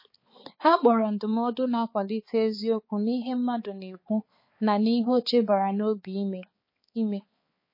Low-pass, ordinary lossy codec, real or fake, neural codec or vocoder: 5.4 kHz; MP3, 24 kbps; fake; codec, 16 kHz, 4 kbps, X-Codec, HuBERT features, trained on balanced general audio